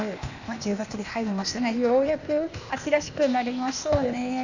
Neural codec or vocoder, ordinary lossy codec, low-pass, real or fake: codec, 16 kHz, 0.8 kbps, ZipCodec; none; 7.2 kHz; fake